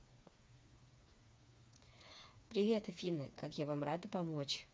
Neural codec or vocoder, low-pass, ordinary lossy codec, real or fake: codec, 16 kHz, 4 kbps, FreqCodec, smaller model; none; none; fake